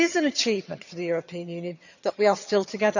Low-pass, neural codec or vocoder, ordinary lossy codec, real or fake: 7.2 kHz; vocoder, 22.05 kHz, 80 mel bands, HiFi-GAN; none; fake